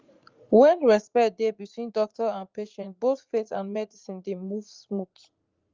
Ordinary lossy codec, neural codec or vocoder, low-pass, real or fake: Opus, 24 kbps; vocoder, 44.1 kHz, 80 mel bands, Vocos; 7.2 kHz; fake